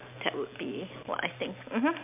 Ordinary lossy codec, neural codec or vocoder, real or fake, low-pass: MP3, 32 kbps; none; real; 3.6 kHz